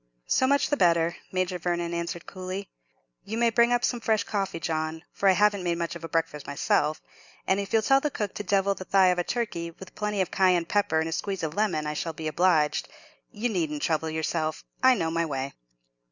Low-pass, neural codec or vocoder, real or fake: 7.2 kHz; none; real